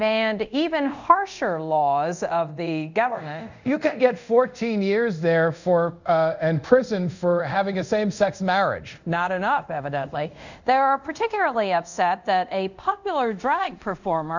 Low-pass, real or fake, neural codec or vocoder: 7.2 kHz; fake; codec, 24 kHz, 0.5 kbps, DualCodec